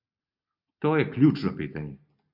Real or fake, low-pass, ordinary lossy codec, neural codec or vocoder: real; 5.4 kHz; MP3, 48 kbps; none